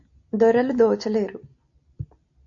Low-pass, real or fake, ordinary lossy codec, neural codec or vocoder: 7.2 kHz; real; AAC, 48 kbps; none